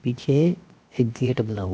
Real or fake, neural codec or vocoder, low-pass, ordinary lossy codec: fake; codec, 16 kHz, 0.7 kbps, FocalCodec; none; none